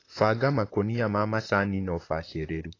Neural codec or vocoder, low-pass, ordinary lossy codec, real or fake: vocoder, 44.1 kHz, 128 mel bands, Pupu-Vocoder; 7.2 kHz; AAC, 32 kbps; fake